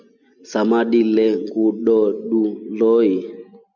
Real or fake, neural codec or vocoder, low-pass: real; none; 7.2 kHz